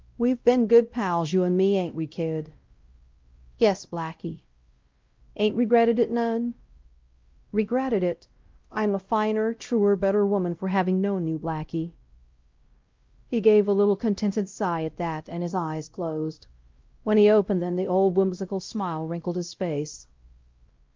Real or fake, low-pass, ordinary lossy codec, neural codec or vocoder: fake; 7.2 kHz; Opus, 32 kbps; codec, 16 kHz, 0.5 kbps, X-Codec, WavLM features, trained on Multilingual LibriSpeech